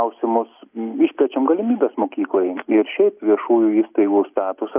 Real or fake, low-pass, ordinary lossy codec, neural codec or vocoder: real; 3.6 kHz; AAC, 32 kbps; none